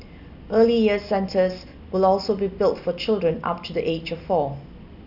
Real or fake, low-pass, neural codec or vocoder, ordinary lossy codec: real; 5.4 kHz; none; none